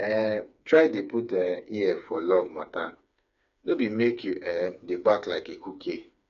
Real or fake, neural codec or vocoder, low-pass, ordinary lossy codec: fake; codec, 16 kHz, 4 kbps, FreqCodec, smaller model; 7.2 kHz; none